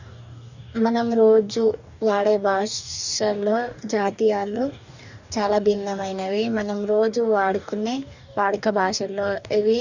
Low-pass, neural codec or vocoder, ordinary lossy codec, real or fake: 7.2 kHz; codec, 44.1 kHz, 2.6 kbps, DAC; none; fake